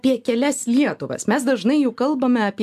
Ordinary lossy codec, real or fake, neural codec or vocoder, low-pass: AAC, 96 kbps; real; none; 14.4 kHz